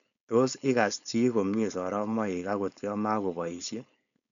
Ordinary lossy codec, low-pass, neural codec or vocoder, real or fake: none; 7.2 kHz; codec, 16 kHz, 4.8 kbps, FACodec; fake